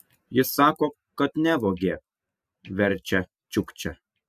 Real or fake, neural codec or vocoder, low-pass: real; none; 14.4 kHz